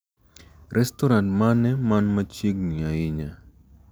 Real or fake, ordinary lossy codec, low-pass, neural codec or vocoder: real; none; none; none